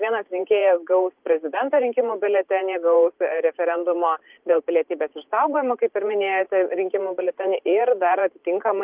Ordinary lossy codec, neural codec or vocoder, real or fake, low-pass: Opus, 24 kbps; vocoder, 44.1 kHz, 128 mel bands every 512 samples, BigVGAN v2; fake; 3.6 kHz